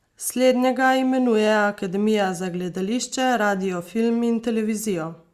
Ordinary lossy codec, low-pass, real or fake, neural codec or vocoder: Opus, 64 kbps; 14.4 kHz; real; none